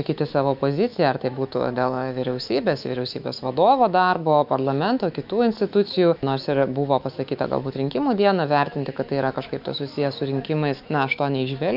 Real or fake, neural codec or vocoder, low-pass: fake; autoencoder, 48 kHz, 128 numbers a frame, DAC-VAE, trained on Japanese speech; 5.4 kHz